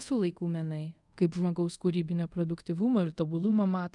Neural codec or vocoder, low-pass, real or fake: codec, 24 kHz, 0.5 kbps, DualCodec; 10.8 kHz; fake